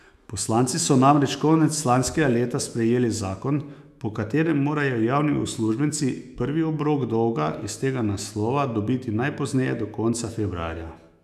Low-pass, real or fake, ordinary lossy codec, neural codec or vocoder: 14.4 kHz; fake; none; autoencoder, 48 kHz, 128 numbers a frame, DAC-VAE, trained on Japanese speech